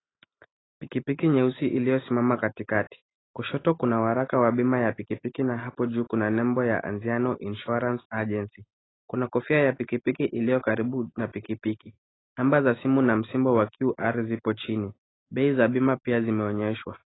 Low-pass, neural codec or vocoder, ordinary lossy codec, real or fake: 7.2 kHz; none; AAC, 16 kbps; real